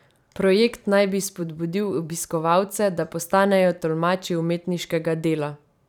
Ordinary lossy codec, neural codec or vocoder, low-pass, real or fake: none; vocoder, 44.1 kHz, 128 mel bands every 512 samples, BigVGAN v2; 19.8 kHz; fake